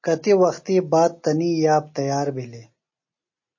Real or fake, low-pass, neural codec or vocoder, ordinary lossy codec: real; 7.2 kHz; none; MP3, 32 kbps